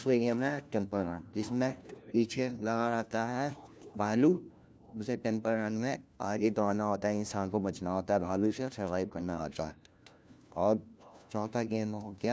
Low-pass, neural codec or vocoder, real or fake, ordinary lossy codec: none; codec, 16 kHz, 1 kbps, FunCodec, trained on LibriTTS, 50 frames a second; fake; none